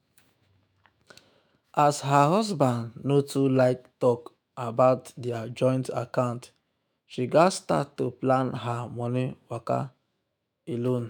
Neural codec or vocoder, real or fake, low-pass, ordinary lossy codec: autoencoder, 48 kHz, 128 numbers a frame, DAC-VAE, trained on Japanese speech; fake; none; none